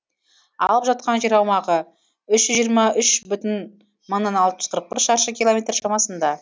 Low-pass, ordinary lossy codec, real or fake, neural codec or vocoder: none; none; real; none